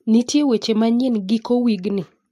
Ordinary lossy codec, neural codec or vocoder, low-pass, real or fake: AAC, 64 kbps; none; 14.4 kHz; real